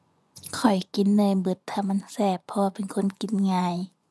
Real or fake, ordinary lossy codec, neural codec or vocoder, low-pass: fake; none; vocoder, 24 kHz, 100 mel bands, Vocos; none